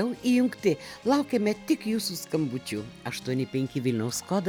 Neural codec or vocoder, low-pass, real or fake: none; 19.8 kHz; real